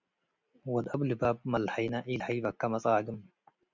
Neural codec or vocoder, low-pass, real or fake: none; 7.2 kHz; real